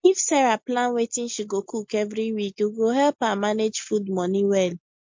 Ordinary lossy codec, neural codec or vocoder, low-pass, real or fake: MP3, 48 kbps; none; 7.2 kHz; real